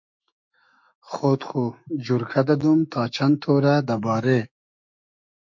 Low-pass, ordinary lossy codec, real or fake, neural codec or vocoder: 7.2 kHz; MP3, 48 kbps; fake; autoencoder, 48 kHz, 128 numbers a frame, DAC-VAE, trained on Japanese speech